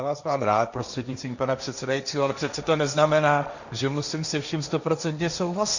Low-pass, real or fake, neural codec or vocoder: 7.2 kHz; fake; codec, 16 kHz, 1.1 kbps, Voila-Tokenizer